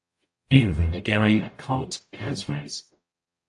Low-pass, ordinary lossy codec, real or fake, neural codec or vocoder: 10.8 kHz; AAC, 64 kbps; fake; codec, 44.1 kHz, 0.9 kbps, DAC